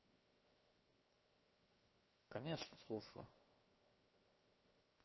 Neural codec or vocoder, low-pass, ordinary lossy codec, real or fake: codec, 16 kHz, 0.7 kbps, FocalCodec; 7.2 kHz; MP3, 24 kbps; fake